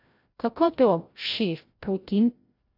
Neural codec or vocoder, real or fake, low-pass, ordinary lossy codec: codec, 16 kHz, 0.5 kbps, FreqCodec, larger model; fake; 5.4 kHz; AAC, 32 kbps